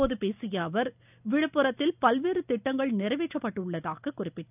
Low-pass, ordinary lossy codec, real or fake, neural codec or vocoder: 3.6 kHz; none; real; none